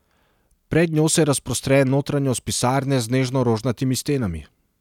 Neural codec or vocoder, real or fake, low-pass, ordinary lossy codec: none; real; 19.8 kHz; none